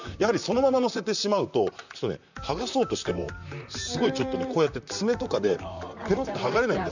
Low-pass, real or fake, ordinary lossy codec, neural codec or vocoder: 7.2 kHz; fake; none; vocoder, 44.1 kHz, 128 mel bands, Pupu-Vocoder